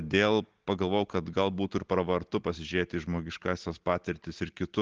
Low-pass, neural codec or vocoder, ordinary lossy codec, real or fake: 7.2 kHz; none; Opus, 16 kbps; real